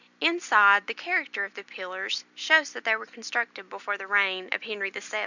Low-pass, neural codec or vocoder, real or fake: 7.2 kHz; none; real